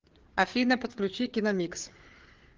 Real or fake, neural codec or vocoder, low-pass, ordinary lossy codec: fake; codec, 16 kHz, 4 kbps, FreqCodec, larger model; 7.2 kHz; Opus, 16 kbps